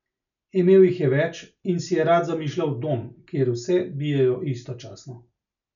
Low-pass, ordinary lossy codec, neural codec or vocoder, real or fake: 7.2 kHz; none; none; real